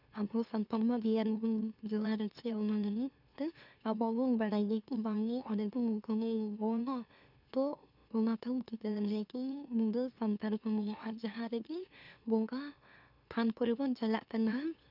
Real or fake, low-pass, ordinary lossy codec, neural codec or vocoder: fake; 5.4 kHz; none; autoencoder, 44.1 kHz, a latent of 192 numbers a frame, MeloTTS